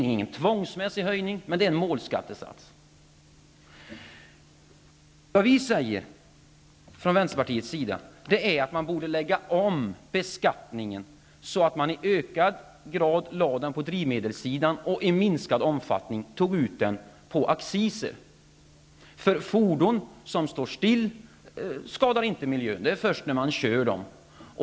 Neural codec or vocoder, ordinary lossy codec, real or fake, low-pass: none; none; real; none